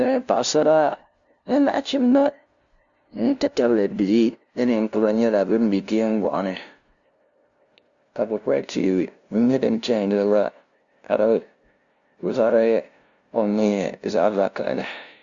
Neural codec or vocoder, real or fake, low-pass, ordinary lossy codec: codec, 16 kHz, 0.5 kbps, FunCodec, trained on LibriTTS, 25 frames a second; fake; 7.2 kHz; Opus, 64 kbps